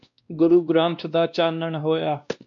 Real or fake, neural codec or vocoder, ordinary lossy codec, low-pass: fake; codec, 16 kHz, 1 kbps, X-Codec, WavLM features, trained on Multilingual LibriSpeech; MP3, 64 kbps; 7.2 kHz